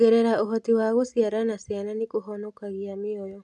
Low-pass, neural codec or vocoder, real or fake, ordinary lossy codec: none; none; real; none